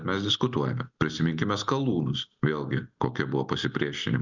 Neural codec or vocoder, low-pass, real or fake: none; 7.2 kHz; real